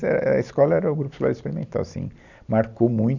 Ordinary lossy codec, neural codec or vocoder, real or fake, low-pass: none; none; real; 7.2 kHz